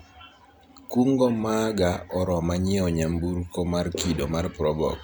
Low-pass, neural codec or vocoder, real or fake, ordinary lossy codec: none; none; real; none